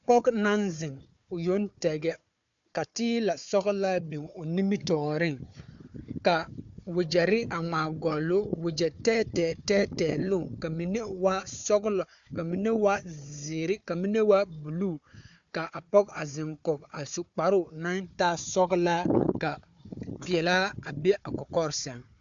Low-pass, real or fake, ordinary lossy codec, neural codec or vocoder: 7.2 kHz; fake; MP3, 64 kbps; codec, 16 kHz, 4 kbps, FunCodec, trained on Chinese and English, 50 frames a second